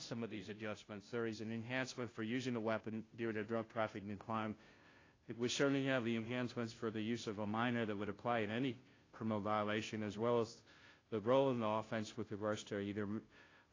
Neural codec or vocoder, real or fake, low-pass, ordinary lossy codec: codec, 16 kHz, 0.5 kbps, FunCodec, trained on Chinese and English, 25 frames a second; fake; 7.2 kHz; AAC, 32 kbps